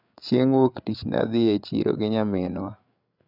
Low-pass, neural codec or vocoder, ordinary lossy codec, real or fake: 5.4 kHz; codec, 16 kHz, 8 kbps, FreqCodec, larger model; none; fake